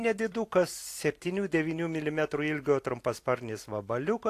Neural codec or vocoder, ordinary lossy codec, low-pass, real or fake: vocoder, 44.1 kHz, 128 mel bands every 256 samples, BigVGAN v2; AAC, 64 kbps; 14.4 kHz; fake